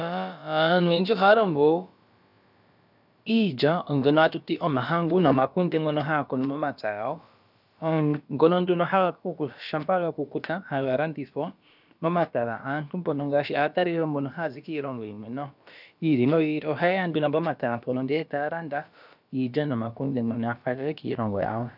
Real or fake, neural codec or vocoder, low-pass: fake; codec, 16 kHz, about 1 kbps, DyCAST, with the encoder's durations; 5.4 kHz